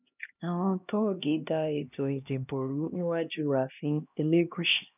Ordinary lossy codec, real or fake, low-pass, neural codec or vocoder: none; fake; 3.6 kHz; codec, 16 kHz, 1 kbps, X-Codec, HuBERT features, trained on LibriSpeech